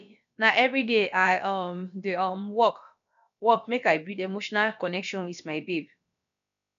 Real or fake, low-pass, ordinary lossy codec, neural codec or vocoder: fake; 7.2 kHz; none; codec, 16 kHz, about 1 kbps, DyCAST, with the encoder's durations